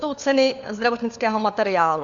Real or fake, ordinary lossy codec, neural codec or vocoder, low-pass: fake; Opus, 64 kbps; codec, 16 kHz, 4 kbps, FunCodec, trained on LibriTTS, 50 frames a second; 7.2 kHz